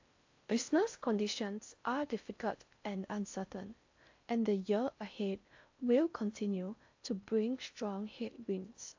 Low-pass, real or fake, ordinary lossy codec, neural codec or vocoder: 7.2 kHz; fake; AAC, 48 kbps; codec, 16 kHz in and 24 kHz out, 0.6 kbps, FocalCodec, streaming, 4096 codes